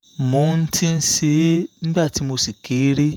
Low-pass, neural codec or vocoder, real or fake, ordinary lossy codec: none; vocoder, 48 kHz, 128 mel bands, Vocos; fake; none